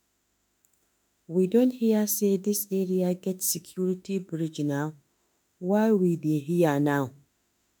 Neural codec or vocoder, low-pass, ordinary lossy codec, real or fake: autoencoder, 48 kHz, 32 numbers a frame, DAC-VAE, trained on Japanese speech; none; none; fake